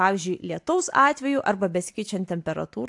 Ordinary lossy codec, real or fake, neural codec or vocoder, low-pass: AAC, 64 kbps; real; none; 10.8 kHz